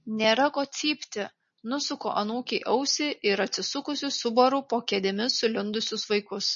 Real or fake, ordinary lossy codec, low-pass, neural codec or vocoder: real; MP3, 32 kbps; 7.2 kHz; none